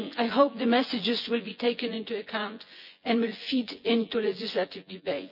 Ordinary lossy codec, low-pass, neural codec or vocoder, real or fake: none; 5.4 kHz; vocoder, 24 kHz, 100 mel bands, Vocos; fake